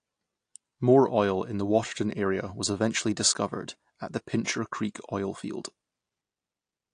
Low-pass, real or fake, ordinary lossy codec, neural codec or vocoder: 9.9 kHz; real; AAC, 48 kbps; none